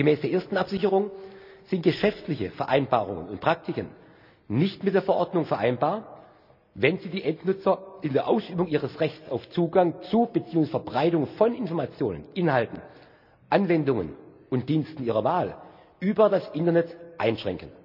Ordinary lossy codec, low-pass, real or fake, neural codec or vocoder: none; 5.4 kHz; real; none